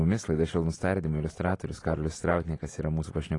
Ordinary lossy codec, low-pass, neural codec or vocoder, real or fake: AAC, 32 kbps; 10.8 kHz; none; real